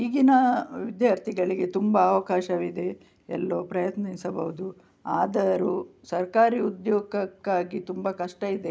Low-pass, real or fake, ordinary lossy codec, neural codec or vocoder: none; real; none; none